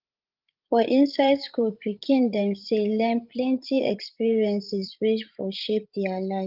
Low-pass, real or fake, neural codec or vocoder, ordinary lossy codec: 5.4 kHz; fake; codec, 16 kHz, 16 kbps, FreqCodec, larger model; Opus, 32 kbps